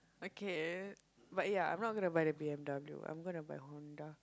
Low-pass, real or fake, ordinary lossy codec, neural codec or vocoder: none; real; none; none